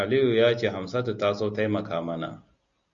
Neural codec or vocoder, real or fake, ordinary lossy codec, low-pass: none; real; Opus, 64 kbps; 7.2 kHz